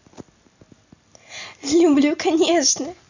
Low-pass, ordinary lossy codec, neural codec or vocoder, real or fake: 7.2 kHz; none; none; real